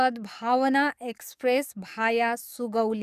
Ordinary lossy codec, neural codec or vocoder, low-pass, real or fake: none; codec, 44.1 kHz, 7.8 kbps, DAC; 14.4 kHz; fake